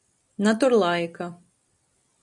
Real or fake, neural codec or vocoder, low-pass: real; none; 10.8 kHz